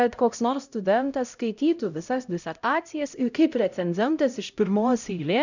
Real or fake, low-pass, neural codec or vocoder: fake; 7.2 kHz; codec, 16 kHz, 0.5 kbps, X-Codec, HuBERT features, trained on LibriSpeech